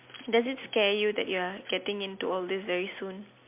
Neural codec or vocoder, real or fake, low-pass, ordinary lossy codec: none; real; 3.6 kHz; MP3, 32 kbps